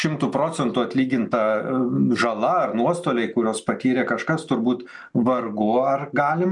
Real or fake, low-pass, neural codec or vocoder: real; 10.8 kHz; none